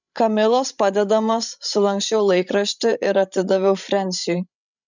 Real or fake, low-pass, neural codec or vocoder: fake; 7.2 kHz; codec, 16 kHz, 16 kbps, FreqCodec, larger model